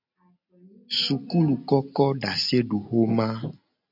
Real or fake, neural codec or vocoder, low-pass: real; none; 5.4 kHz